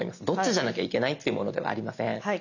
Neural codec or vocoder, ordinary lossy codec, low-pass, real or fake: none; none; 7.2 kHz; real